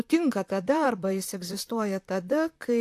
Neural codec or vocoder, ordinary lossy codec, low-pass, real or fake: vocoder, 44.1 kHz, 128 mel bands, Pupu-Vocoder; AAC, 64 kbps; 14.4 kHz; fake